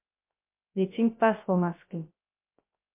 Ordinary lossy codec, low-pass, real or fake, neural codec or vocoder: MP3, 24 kbps; 3.6 kHz; fake; codec, 16 kHz, 0.3 kbps, FocalCodec